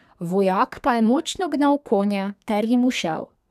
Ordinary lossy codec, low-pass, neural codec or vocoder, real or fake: none; 14.4 kHz; codec, 32 kHz, 1.9 kbps, SNAC; fake